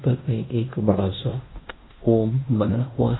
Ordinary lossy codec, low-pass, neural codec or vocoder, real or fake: AAC, 16 kbps; 7.2 kHz; codec, 16 kHz in and 24 kHz out, 0.9 kbps, LongCat-Audio-Codec, fine tuned four codebook decoder; fake